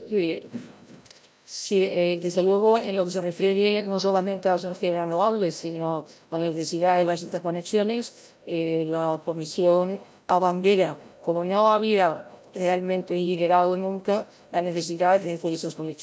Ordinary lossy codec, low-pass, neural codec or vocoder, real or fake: none; none; codec, 16 kHz, 0.5 kbps, FreqCodec, larger model; fake